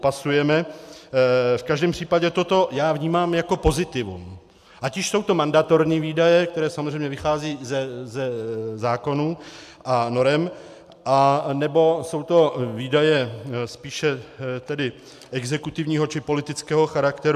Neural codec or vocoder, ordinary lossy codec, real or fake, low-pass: none; AAC, 96 kbps; real; 14.4 kHz